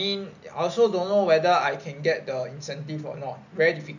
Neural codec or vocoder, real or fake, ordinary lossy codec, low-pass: none; real; none; 7.2 kHz